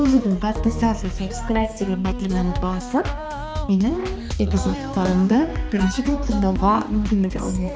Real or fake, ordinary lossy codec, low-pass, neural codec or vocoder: fake; none; none; codec, 16 kHz, 2 kbps, X-Codec, HuBERT features, trained on balanced general audio